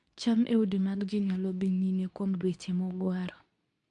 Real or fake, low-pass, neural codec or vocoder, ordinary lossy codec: fake; 10.8 kHz; codec, 24 kHz, 0.9 kbps, WavTokenizer, medium speech release version 2; MP3, 64 kbps